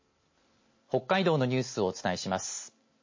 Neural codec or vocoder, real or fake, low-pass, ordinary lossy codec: none; real; 7.2 kHz; MP3, 32 kbps